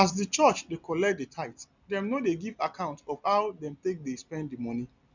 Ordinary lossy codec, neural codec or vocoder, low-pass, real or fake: none; none; 7.2 kHz; real